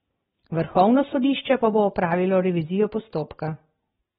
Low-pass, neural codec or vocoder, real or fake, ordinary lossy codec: 19.8 kHz; none; real; AAC, 16 kbps